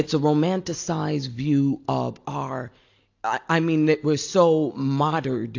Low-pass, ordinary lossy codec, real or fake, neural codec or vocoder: 7.2 kHz; AAC, 48 kbps; real; none